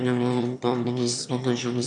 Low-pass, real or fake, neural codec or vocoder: 9.9 kHz; fake; autoencoder, 22.05 kHz, a latent of 192 numbers a frame, VITS, trained on one speaker